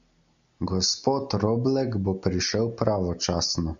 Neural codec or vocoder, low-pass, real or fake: none; 7.2 kHz; real